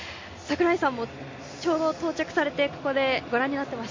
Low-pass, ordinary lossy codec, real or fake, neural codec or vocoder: 7.2 kHz; MP3, 32 kbps; real; none